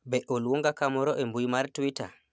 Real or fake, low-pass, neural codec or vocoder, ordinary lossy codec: real; none; none; none